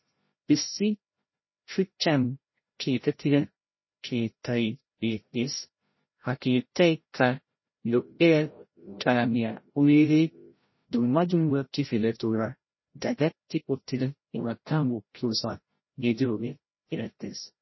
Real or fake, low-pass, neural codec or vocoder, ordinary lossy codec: fake; 7.2 kHz; codec, 16 kHz, 0.5 kbps, FreqCodec, larger model; MP3, 24 kbps